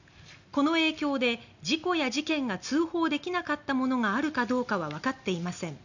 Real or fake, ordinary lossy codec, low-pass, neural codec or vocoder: real; none; 7.2 kHz; none